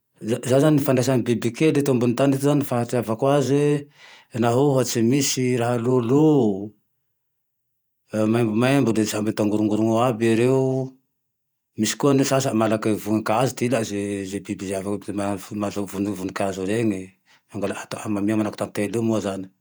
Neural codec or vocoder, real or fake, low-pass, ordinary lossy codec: vocoder, 48 kHz, 128 mel bands, Vocos; fake; none; none